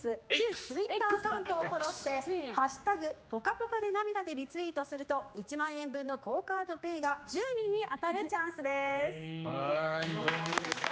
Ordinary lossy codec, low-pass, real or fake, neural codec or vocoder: none; none; fake; codec, 16 kHz, 2 kbps, X-Codec, HuBERT features, trained on general audio